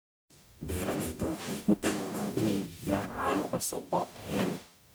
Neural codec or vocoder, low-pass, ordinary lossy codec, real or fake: codec, 44.1 kHz, 0.9 kbps, DAC; none; none; fake